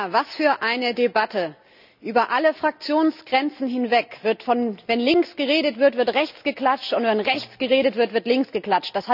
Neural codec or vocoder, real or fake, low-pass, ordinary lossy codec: none; real; 5.4 kHz; none